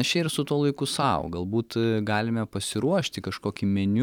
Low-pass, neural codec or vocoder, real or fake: 19.8 kHz; vocoder, 44.1 kHz, 128 mel bands every 256 samples, BigVGAN v2; fake